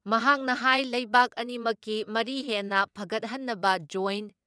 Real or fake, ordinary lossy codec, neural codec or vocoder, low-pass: fake; none; vocoder, 22.05 kHz, 80 mel bands, WaveNeXt; none